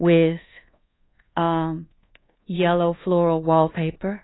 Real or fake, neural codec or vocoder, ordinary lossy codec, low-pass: fake; codec, 24 kHz, 1.2 kbps, DualCodec; AAC, 16 kbps; 7.2 kHz